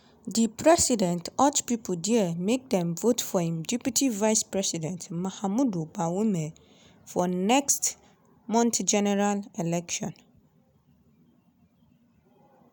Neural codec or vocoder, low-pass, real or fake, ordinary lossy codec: none; none; real; none